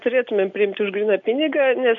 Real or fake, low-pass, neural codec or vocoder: real; 7.2 kHz; none